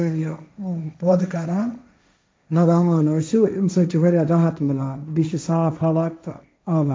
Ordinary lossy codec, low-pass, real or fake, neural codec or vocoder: none; none; fake; codec, 16 kHz, 1.1 kbps, Voila-Tokenizer